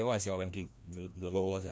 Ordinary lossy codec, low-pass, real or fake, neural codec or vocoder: none; none; fake; codec, 16 kHz, 1 kbps, FreqCodec, larger model